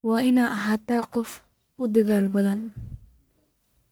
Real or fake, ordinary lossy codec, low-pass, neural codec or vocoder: fake; none; none; codec, 44.1 kHz, 1.7 kbps, Pupu-Codec